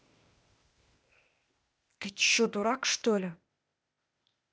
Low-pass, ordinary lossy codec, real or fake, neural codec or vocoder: none; none; fake; codec, 16 kHz, 0.8 kbps, ZipCodec